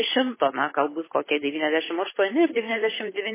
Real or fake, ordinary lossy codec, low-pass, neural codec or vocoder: fake; MP3, 16 kbps; 3.6 kHz; codec, 16 kHz, 8 kbps, FunCodec, trained on Chinese and English, 25 frames a second